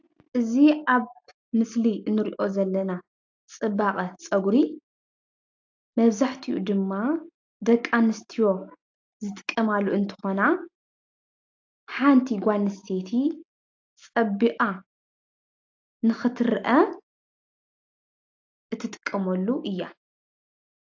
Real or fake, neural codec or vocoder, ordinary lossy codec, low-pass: real; none; AAC, 48 kbps; 7.2 kHz